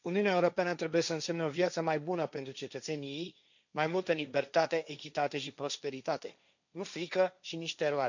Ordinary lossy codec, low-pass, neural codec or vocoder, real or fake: none; 7.2 kHz; codec, 16 kHz, 1.1 kbps, Voila-Tokenizer; fake